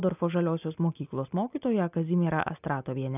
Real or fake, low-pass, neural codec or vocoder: real; 3.6 kHz; none